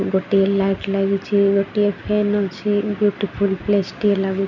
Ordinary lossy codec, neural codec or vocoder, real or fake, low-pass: none; none; real; 7.2 kHz